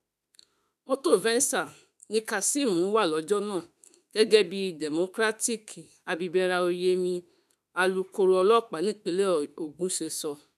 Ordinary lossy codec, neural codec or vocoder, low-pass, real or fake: none; autoencoder, 48 kHz, 32 numbers a frame, DAC-VAE, trained on Japanese speech; 14.4 kHz; fake